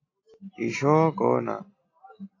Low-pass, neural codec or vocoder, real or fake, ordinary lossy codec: 7.2 kHz; none; real; AAC, 32 kbps